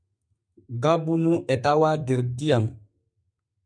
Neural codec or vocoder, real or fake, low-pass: codec, 32 kHz, 1.9 kbps, SNAC; fake; 9.9 kHz